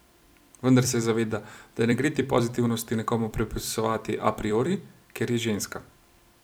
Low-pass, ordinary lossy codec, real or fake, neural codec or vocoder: none; none; fake; vocoder, 44.1 kHz, 128 mel bands every 256 samples, BigVGAN v2